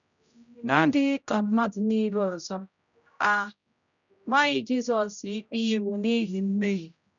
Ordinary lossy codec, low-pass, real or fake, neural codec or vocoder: none; 7.2 kHz; fake; codec, 16 kHz, 0.5 kbps, X-Codec, HuBERT features, trained on general audio